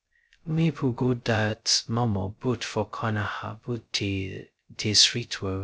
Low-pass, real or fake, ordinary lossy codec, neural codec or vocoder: none; fake; none; codec, 16 kHz, 0.2 kbps, FocalCodec